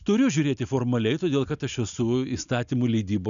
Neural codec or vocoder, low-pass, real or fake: none; 7.2 kHz; real